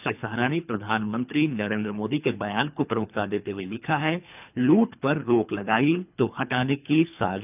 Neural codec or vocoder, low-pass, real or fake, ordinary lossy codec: codec, 24 kHz, 3 kbps, HILCodec; 3.6 kHz; fake; none